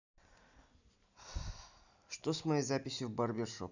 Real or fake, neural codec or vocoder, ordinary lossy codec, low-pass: real; none; none; 7.2 kHz